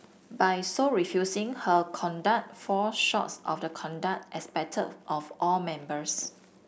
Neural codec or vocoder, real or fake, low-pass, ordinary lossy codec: none; real; none; none